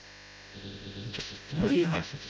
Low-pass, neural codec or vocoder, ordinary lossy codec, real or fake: none; codec, 16 kHz, 0.5 kbps, FreqCodec, smaller model; none; fake